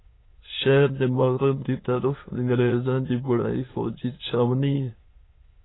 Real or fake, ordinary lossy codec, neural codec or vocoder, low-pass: fake; AAC, 16 kbps; autoencoder, 22.05 kHz, a latent of 192 numbers a frame, VITS, trained on many speakers; 7.2 kHz